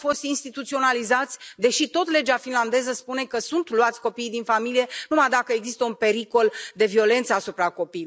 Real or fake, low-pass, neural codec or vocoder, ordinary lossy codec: real; none; none; none